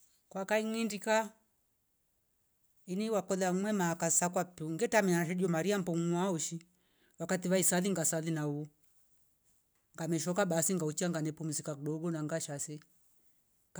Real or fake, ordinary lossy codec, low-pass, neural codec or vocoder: real; none; none; none